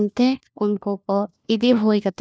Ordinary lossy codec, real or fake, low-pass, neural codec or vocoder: none; fake; none; codec, 16 kHz, 1 kbps, FunCodec, trained on LibriTTS, 50 frames a second